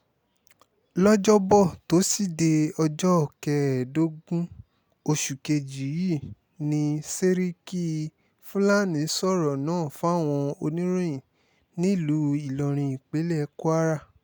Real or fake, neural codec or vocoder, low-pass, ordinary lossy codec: real; none; none; none